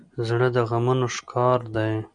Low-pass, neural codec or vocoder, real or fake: 9.9 kHz; none; real